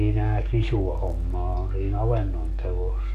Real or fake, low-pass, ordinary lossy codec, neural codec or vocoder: fake; 14.4 kHz; none; codec, 44.1 kHz, 7.8 kbps, DAC